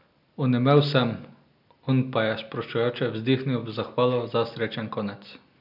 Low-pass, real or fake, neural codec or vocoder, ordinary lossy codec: 5.4 kHz; real; none; none